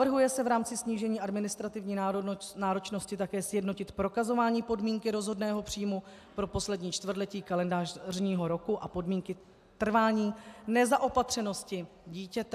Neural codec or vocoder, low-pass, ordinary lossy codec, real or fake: none; 14.4 kHz; AAC, 96 kbps; real